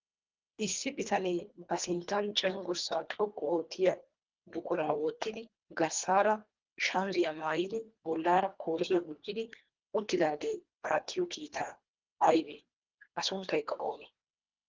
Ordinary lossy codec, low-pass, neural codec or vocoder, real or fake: Opus, 32 kbps; 7.2 kHz; codec, 24 kHz, 1.5 kbps, HILCodec; fake